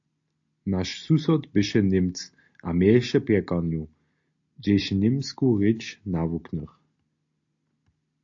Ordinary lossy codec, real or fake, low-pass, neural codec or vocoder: AAC, 48 kbps; real; 7.2 kHz; none